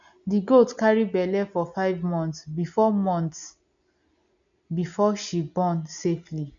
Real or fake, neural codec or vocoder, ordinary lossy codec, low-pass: real; none; none; 7.2 kHz